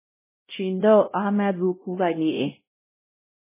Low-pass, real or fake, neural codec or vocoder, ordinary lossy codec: 3.6 kHz; fake; codec, 16 kHz, 0.5 kbps, X-Codec, WavLM features, trained on Multilingual LibriSpeech; MP3, 16 kbps